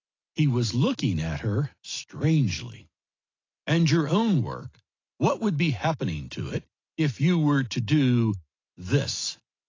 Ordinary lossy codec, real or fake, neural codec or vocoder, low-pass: AAC, 32 kbps; real; none; 7.2 kHz